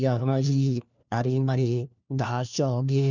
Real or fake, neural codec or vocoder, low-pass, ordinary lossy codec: fake; codec, 16 kHz, 1 kbps, FunCodec, trained on LibriTTS, 50 frames a second; 7.2 kHz; none